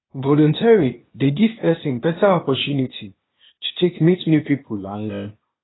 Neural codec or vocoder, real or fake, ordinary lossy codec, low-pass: codec, 16 kHz, 0.8 kbps, ZipCodec; fake; AAC, 16 kbps; 7.2 kHz